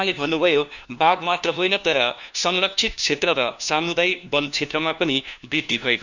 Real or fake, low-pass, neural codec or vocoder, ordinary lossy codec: fake; 7.2 kHz; codec, 16 kHz, 1 kbps, FunCodec, trained on LibriTTS, 50 frames a second; none